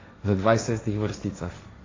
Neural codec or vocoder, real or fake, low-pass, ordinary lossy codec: codec, 16 kHz, 1.1 kbps, Voila-Tokenizer; fake; 7.2 kHz; AAC, 32 kbps